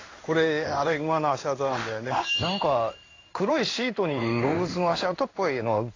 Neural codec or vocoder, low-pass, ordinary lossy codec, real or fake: codec, 16 kHz in and 24 kHz out, 1 kbps, XY-Tokenizer; 7.2 kHz; AAC, 32 kbps; fake